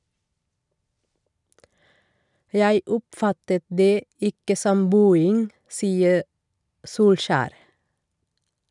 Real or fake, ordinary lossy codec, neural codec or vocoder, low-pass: real; none; none; 10.8 kHz